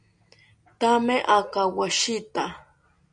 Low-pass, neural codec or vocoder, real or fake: 9.9 kHz; none; real